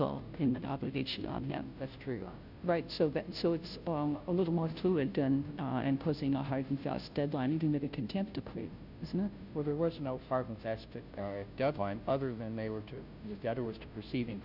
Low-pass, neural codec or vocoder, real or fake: 5.4 kHz; codec, 16 kHz, 0.5 kbps, FunCodec, trained on Chinese and English, 25 frames a second; fake